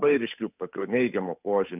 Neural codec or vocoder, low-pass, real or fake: codec, 16 kHz, 8 kbps, FunCodec, trained on Chinese and English, 25 frames a second; 3.6 kHz; fake